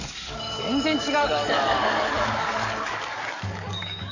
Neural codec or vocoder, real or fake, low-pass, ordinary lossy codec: codec, 44.1 kHz, 7.8 kbps, DAC; fake; 7.2 kHz; none